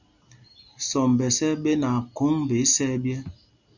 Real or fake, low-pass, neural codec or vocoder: real; 7.2 kHz; none